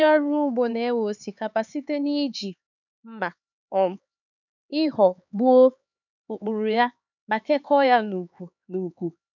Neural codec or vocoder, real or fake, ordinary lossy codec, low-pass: codec, 16 kHz, 4 kbps, X-Codec, HuBERT features, trained on LibriSpeech; fake; none; 7.2 kHz